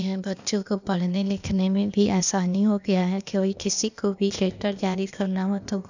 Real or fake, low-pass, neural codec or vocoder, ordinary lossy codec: fake; 7.2 kHz; codec, 16 kHz, 0.8 kbps, ZipCodec; none